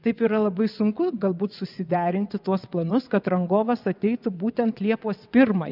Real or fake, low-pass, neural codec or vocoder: fake; 5.4 kHz; vocoder, 22.05 kHz, 80 mel bands, WaveNeXt